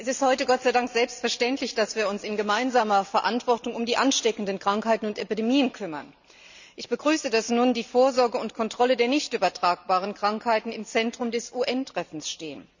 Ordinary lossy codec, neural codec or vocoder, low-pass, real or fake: none; none; 7.2 kHz; real